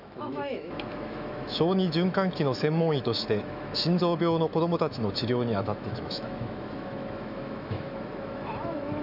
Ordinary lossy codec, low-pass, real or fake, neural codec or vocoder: none; 5.4 kHz; fake; autoencoder, 48 kHz, 128 numbers a frame, DAC-VAE, trained on Japanese speech